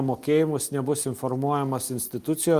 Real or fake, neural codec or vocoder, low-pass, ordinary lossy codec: real; none; 14.4 kHz; Opus, 32 kbps